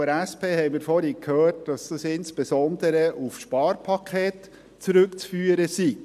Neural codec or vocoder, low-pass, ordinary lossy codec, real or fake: none; 14.4 kHz; none; real